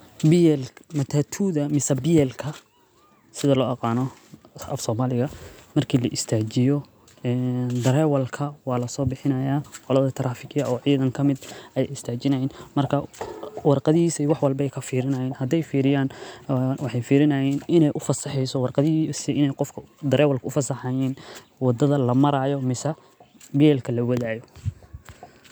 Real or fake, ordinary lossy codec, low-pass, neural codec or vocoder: real; none; none; none